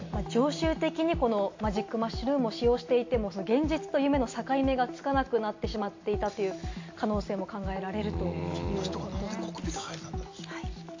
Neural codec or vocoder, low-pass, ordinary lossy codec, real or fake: none; 7.2 kHz; AAC, 48 kbps; real